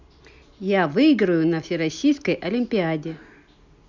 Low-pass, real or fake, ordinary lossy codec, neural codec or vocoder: 7.2 kHz; real; none; none